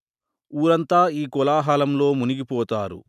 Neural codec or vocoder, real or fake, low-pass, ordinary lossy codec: none; real; 14.4 kHz; none